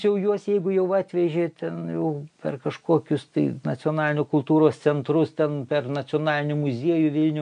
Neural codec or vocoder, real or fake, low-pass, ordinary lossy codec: none; real; 9.9 kHz; MP3, 64 kbps